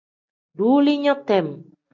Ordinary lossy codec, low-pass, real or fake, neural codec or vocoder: AAC, 48 kbps; 7.2 kHz; real; none